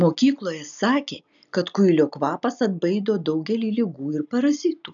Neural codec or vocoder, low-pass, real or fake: none; 7.2 kHz; real